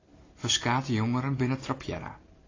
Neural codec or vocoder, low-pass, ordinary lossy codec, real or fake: none; 7.2 kHz; AAC, 32 kbps; real